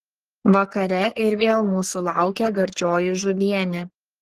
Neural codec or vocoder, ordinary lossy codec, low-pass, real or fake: codec, 44.1 kHz, 3.4 kbps, Pupu-Codec; Opus, 16 kbps; 14.4 kHz; fake